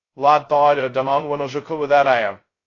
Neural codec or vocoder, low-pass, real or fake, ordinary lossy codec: codec, 16 kHz, 0.2 kbps, FocalCodec; 7.2 kHz; fake; AAC, 32 kbps